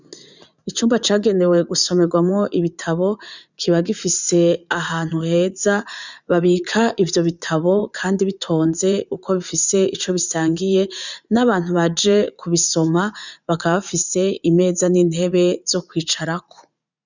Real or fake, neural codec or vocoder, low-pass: fake; vocoder, 44.1 kHz, 80 mel bands, Vocos; 7.2 kHz